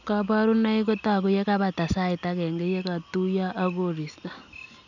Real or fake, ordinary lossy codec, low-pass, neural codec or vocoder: real; none; 7.2 kHz; none